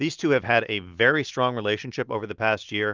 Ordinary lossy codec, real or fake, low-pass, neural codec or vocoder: Opus, 24 kbps; real; 7.2 kHz; none